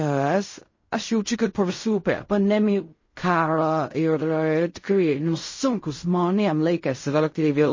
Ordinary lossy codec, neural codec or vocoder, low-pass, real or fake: MP3, 32 kbps; codec, 16 kHz in and 24 kHz out, 0.4 kbps, LongCat-Audio-Codec, fine tuned four codebook decoder; 7.2 kHz; fake